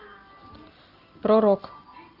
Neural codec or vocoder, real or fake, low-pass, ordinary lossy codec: none; real; 5.4 kHz; Opus, 24 kbps